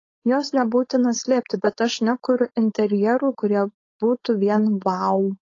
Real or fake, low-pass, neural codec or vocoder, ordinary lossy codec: fake; 7.2 kHz; codec, 16 kHz, 4.8 kbps, FACodec; AAC, 32 kbps